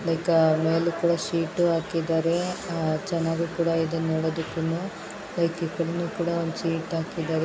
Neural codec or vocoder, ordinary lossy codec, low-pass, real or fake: none; none; none; real